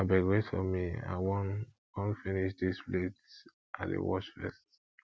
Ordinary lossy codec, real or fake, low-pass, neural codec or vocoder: none; real; none; none